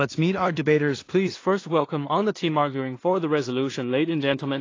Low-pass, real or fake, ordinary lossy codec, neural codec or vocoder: 7.2 kHz; fake; AAC, 32 kbps; codec, 16 kHz in and 24 kHz out, 0.4 kbps, LongCat-Audio-Codec, two codebook decoder